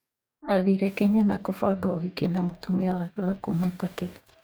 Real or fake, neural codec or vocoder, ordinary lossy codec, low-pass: fake; codec, 44.1 kHz, 2.6 kbps, DAC; none; none